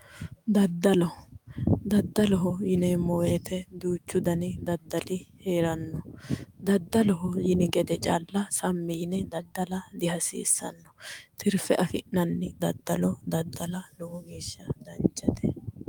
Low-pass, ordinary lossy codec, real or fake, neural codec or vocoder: 19.8 kHz; Opus, 32 kbps; fake; autoencoder, 48 kHz, 128 numbers a frame, DAC-VAE, trained on Japanese speech